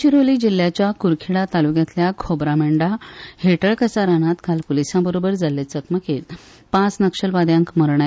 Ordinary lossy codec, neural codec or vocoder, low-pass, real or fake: none; none; none; real